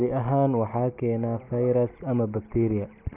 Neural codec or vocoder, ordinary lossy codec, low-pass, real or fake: none; none; 3.6 kHz; real